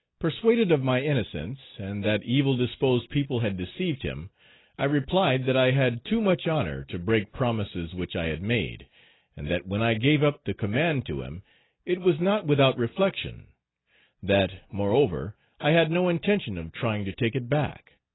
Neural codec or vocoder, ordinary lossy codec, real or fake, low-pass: none; AAC, 16 kbps; real; 7.2 kHz